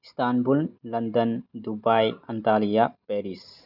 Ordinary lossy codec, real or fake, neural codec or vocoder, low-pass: none; real; none; 5.4 kHz